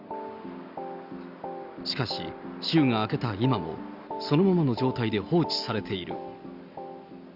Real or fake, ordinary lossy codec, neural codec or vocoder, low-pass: real; Opus, 64 kbps; none; 5.4 kHz